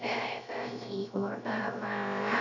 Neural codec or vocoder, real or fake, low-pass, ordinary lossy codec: codec, 16 kHz, 0.3 kbps, FocalCodec; fake; 7.2 kHz; none